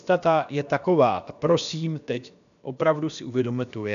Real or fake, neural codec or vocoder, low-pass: fake; codec, 16 kHz, about 1 kbps, DyCAST, with the encoder's durations; 7.2 kHz